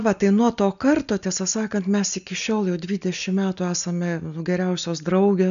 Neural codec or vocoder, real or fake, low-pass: none; real; 7.2 kHz